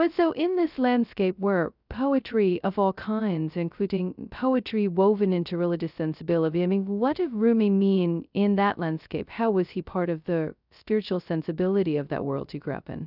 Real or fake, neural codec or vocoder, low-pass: fake; codec, 16 kHz, 0.2 kbps, FocalCodec; 5.4 kHz